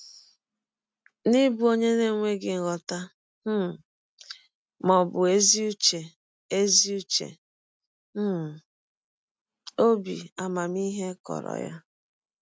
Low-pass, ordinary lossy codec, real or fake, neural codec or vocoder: none; none; real; none